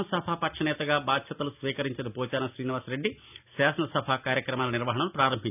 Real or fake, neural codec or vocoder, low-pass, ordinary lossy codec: real; none; 3.6 kHz; none